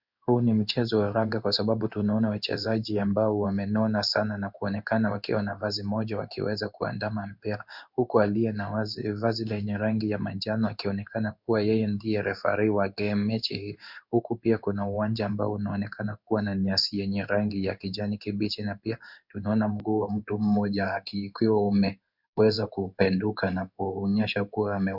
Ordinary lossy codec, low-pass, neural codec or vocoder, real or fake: Opus, 64 kbps; 5.4 kHz; codec, 16 kHz in and 24 kHz out, 1 kbps, XY-Tokenizer; fake